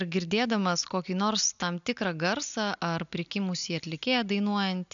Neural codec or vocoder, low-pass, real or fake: none; 7.2 kHz; real